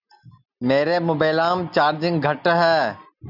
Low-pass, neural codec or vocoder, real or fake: 5.4 kHz; none; real